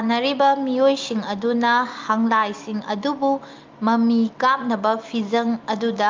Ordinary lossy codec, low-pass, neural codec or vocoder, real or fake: Opus, 24 kbps; 7.2 kHz; none; real